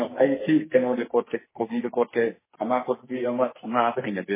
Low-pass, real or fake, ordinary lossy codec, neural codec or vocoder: 3.6 kHz; fake; MP3, 16 kbps; codec, 44.1 kHz, 2.6 kbps, SNAC